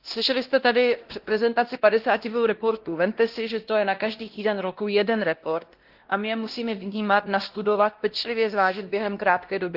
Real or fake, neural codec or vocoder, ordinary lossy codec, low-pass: fake; codec, 16 kHz, 1 kbps, X-Codec, WavLM features, trained on Multilingual LibriSpeech; Opus, 16 kbps; 5.4 kHz